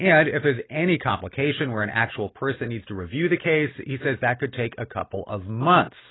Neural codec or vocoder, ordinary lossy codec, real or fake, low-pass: none; AAC, 16 kbps; real; 7.2 kHz